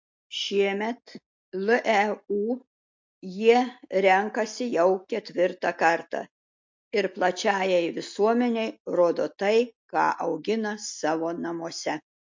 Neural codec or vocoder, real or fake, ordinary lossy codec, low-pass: none; real; MP3, 48 kbps; 7.2 kHz